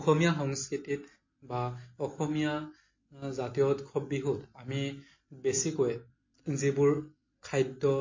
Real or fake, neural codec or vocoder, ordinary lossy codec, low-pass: real; none; MP3, 32 kbps; 7.2 kHz